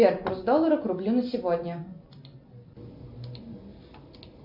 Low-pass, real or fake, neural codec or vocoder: 5.4 kHz; real; none